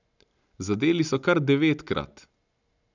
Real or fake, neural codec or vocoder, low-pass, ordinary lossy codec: real; none; 7.2 kHz; none